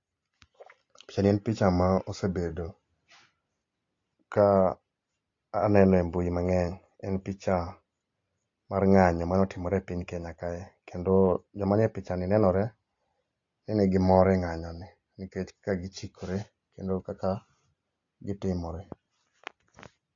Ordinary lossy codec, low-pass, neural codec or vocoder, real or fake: AAC, 48 kbps; 7.2 kHz; none; real